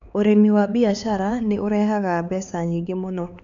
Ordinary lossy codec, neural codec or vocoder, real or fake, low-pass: none; codec, 16 kHz, 4 kbps, X-Codec, HuBERT features, trained on LibriSpeech; fake; 7.2 kHz